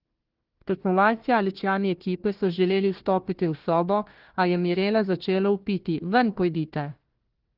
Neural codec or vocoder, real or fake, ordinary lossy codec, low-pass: codec, 16 kHz, 1 kbps, FunCodec, trained on Chinese and English, 50 frames a second; fake; Opus, 16 kbps; 5.4 kHz